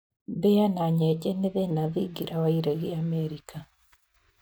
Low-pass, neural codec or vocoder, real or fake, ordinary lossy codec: none; none; real; none